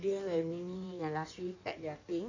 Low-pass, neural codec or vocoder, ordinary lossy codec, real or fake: 7.2 kHz; codec, 16 kHz in and 24 kHz out, 1.1 kbps, FireRedTTS-2 codec; none; fake